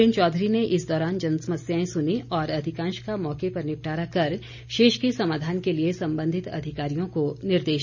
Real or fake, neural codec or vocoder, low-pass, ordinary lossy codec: real; none; 7.2 kHz; none